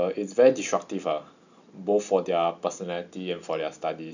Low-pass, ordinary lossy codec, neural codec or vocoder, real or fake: 7.2 kHz; none; none; real